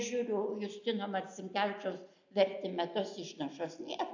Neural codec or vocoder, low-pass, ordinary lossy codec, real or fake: none; 7.2 kHz; AAC, 48 kbps; real